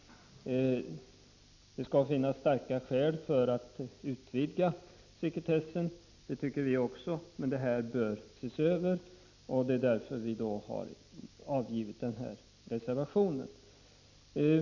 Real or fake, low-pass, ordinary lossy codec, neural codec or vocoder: real; 7.2 kHz; MP3, 64 kbps; none